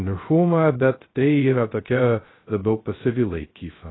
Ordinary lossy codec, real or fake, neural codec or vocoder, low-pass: AAC, 16 kbps; fake; codec, 16 kHz, 0.2 kbps, FocalCodec; 7.2 kHz